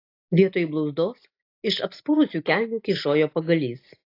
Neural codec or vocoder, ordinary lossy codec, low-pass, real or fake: none; AAC, 32 kbps; 5.4 kHz; real